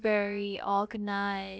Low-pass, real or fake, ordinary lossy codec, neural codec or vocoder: none; fake; none; codec, 16 kHz, about 1 kbps, DyCAST, with the encoder's durations